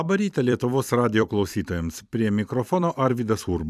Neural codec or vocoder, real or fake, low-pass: vocoder, 44.1 kHz, 128 mel bands every 256 samples, BigVGAN v2; fake; 14.4 kHz